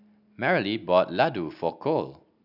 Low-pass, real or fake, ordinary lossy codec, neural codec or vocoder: 5.4 kHz; real; none; none